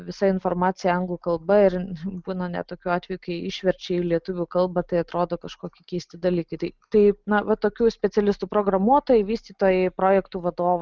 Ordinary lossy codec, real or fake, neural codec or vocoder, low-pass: Opus, 24 kbps; real; none; 7.2 kHz